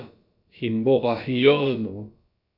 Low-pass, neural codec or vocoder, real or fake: 5.4 kHz; codec, 16 kHz, about 1 kbps, DyCAST, with the encoder's durations; fake